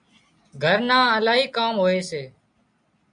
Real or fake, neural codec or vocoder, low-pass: real; none; 9.9 kHz